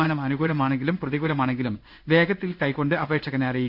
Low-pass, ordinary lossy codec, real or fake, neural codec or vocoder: 5.4 kHz; none; fake; codec, 16 kHz in and 24 kHz out, 1 kbps, XY-Tokenizer